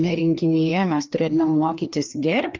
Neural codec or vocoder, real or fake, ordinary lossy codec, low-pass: codec, 16 kHz, 2 kbps, FreqCodec, larger model; fake; Opus, 24 kbps; 7.2 kHz